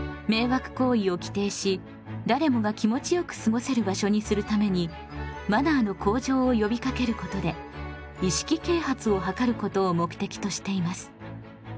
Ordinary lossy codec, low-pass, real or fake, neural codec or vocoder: none; none; real; none